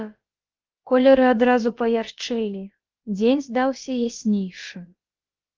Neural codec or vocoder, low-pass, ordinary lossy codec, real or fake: codec, 16 kHz, about 1 kbps, DyCAST, with the encoder's durations; 7.2 kHz; Opus, 24 kbps; fake